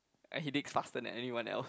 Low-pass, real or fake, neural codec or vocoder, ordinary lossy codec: none; real; none; none